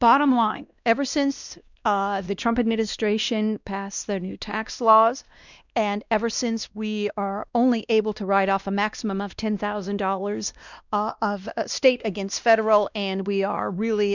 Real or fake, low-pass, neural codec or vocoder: fake; 7.2 kHz; codec, 16 kHz, 1 kbps, X-Codec, WavLM features, trained on Multilingual LibriSpeech